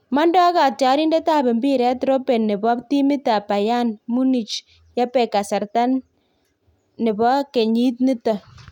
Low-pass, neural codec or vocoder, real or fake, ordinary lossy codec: 19.8 kHz; none; real; none